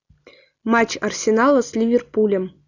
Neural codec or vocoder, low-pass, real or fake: none; 7.2 kHz; real